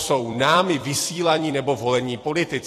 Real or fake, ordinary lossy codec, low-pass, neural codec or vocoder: fake; AAC, 48 kbps; 14.4 kHz; vocoder, 44.1 kHz, 128 mel bands every 256 samples, BigVGAN v2